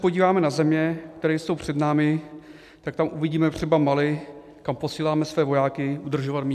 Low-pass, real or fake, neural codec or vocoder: 14.4 kHz; real; none